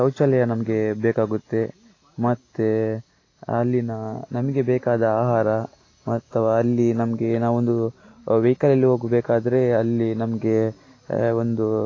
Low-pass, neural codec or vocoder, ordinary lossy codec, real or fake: 7.2 kHz; none; AAC, 32 kbps; real